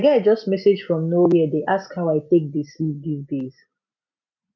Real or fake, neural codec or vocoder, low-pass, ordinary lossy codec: real; none; 7.2 kHz; none